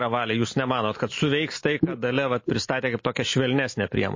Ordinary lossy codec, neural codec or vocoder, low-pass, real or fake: MP3, 32 kbps; none; 7.2 kHz; real